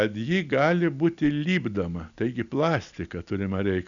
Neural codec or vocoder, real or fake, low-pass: none; real; 7.2 kHz